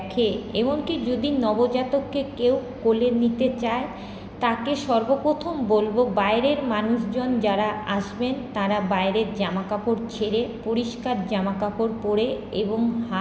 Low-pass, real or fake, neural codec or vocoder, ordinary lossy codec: none; real; none; none